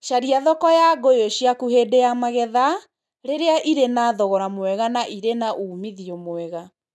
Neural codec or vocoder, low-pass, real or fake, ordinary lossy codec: none; none; real; none